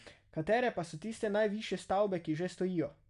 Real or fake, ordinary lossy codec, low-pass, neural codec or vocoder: real; none; 10.8 kHz; none